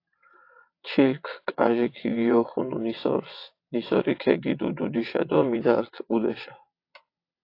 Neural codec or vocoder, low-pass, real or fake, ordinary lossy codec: vocoder, 22.05 kHz, 80 mel bands, WaveNeXt; 5.4 kHz; fake; AAC, 32 kbps